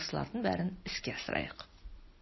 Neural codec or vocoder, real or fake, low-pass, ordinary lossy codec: none; real; 7.2 kHz; MP3, 24 kbps